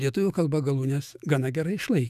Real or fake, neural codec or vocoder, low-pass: fake; autoencoder, 48 kHz, 128 numbers a frame, DAC-VAE, trained on Japanese speech; 14.4 kHz